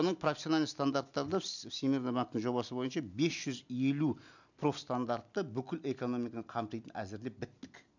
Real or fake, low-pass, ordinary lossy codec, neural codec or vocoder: real; 7.2 kHz; none; none